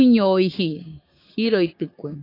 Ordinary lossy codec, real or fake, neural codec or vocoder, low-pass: AAC, 32 kbps; fake; codec, 16 kHz, 6 kbps, DAC; 5.4 kHz